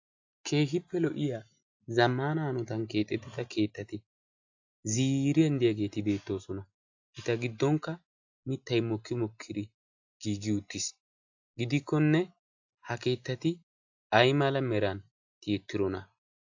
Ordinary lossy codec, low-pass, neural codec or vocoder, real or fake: AAC, 48 kbps; 7.2 kHz; none; real